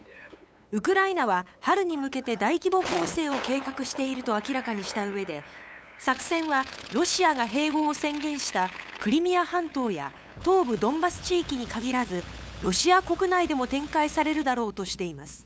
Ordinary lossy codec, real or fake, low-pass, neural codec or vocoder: none; fake; none; codec, 16 kHz, 8 kbps, FunCodec, trained on LibriTTS, 25 frames a second